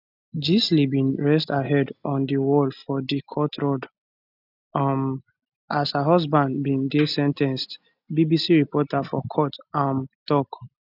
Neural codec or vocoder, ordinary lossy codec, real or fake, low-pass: none; none; real; 5.4 kHz